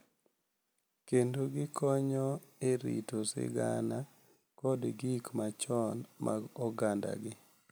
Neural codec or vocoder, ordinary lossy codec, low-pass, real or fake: none; none; none; real